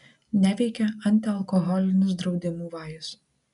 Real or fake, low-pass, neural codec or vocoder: real; 10.8 kHz; none